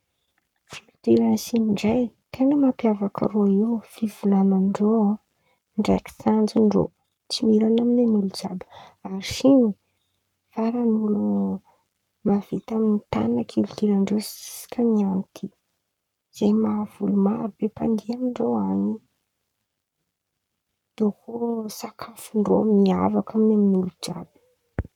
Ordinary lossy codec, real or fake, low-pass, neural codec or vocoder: none; fake; 19.8 kHz; codec, 44.1 kHz, 7.8 kbps, Pupu-Codec